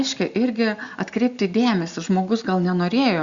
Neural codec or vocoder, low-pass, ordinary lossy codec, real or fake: none; 7.2 kHz; Opus, 64 kbps; real